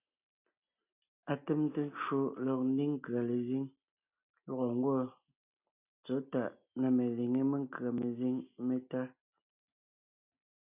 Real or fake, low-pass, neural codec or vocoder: real; 3.6 kHz; none